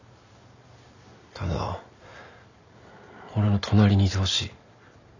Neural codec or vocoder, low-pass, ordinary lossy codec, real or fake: none; 7.2 kHz; none; real